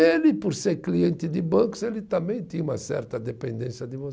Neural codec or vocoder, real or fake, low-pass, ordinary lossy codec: none; real; none; none